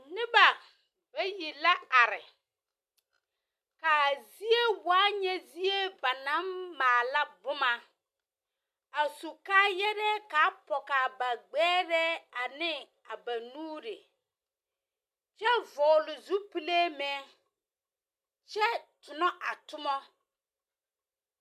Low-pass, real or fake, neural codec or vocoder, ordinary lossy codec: 14.4 kHz; real; none; MP3, 96 kbps